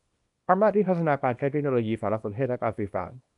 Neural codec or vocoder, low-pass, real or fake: codec, 24 kHz, 0.9 kbps, WavTokenizer, small release; 10.8 kHz; fake